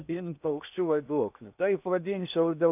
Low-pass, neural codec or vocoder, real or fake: 3.6 kHz; codec, 16 kHz in and 24 kHz out, 0.8 kbps, FocalCodec, streaming, 65536 codes; fake